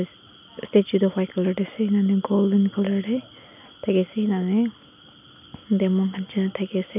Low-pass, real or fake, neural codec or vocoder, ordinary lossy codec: 3.6 kHz; real; none; none